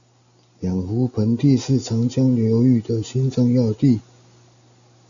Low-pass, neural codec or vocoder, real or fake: 7.2 kHz; none; real